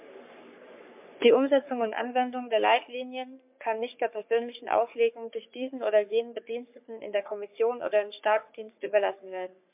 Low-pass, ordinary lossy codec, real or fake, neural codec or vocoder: 3.6 kHz; MP3, 32 kbps; fake; codec, 44.1 kHz, 3.4 kbps, Pupu-Codec